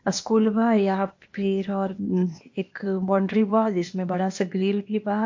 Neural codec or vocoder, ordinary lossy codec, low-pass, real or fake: codec, 16 kHz, 0.8 kbps, ZipCodec; MP3, 48 kbps; 7.2 kHz; fake